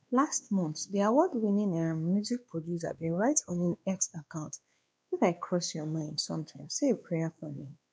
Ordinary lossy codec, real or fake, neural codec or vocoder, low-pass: none; fake; codec, 16 kHz, 2 kbps, X-Codec, WavLM features, trained on Multilingual LibriSpeech; none